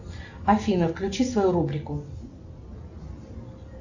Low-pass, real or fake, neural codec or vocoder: 7.2 kHz; real; none